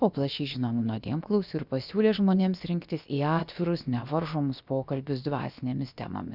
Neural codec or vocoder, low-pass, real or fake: codec, 16 kHz, about 1 kbps, DyCAST, with the encoder's durations; 5.4 kHz; fake